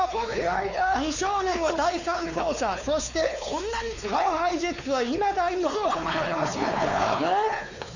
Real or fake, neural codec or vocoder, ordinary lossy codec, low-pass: fake; codec, 16 kHz, 4 kbps, X-Codec, WavLM features, trained on Multilingual LibriSpeech; none; 7.2 kHz